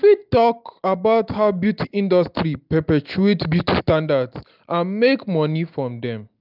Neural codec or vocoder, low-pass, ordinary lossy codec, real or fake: none; 5.4 kHz; none; real